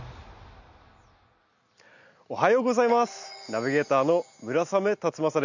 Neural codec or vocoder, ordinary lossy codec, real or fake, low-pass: none; none; real; 7.2 kHz